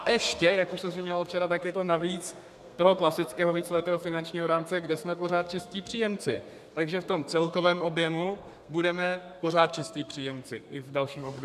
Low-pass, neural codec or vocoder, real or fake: 14.4 kHz; codec, 32 kHz, 1.9 kbps, SNAC; fake